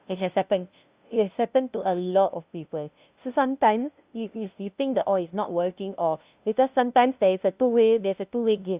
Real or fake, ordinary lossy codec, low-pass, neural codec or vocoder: fake; Opus, 64 kbps; 3.6 kHz; codec, 16 kHz, 0.5 kbps, FunCodec, trained on LibriTTS, 25 frames a second